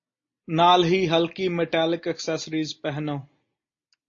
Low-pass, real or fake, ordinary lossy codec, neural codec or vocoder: 7.2 kHz; real; AAC, 48 kbps; none